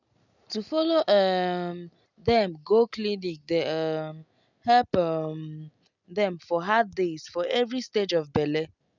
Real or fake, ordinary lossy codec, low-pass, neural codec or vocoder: real; none; 7.2 kHz; none